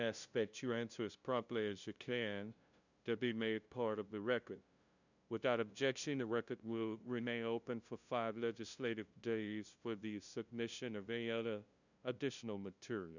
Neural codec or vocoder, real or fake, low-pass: codec, 16 kHz, 0.5 kbps, FunCodec, trained on LibriTTS, 25 frames a second; fake; 7.2 kHz